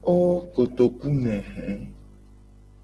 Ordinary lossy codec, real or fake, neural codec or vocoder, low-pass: Opus, 16 kbps; real; none; 10.8 kHz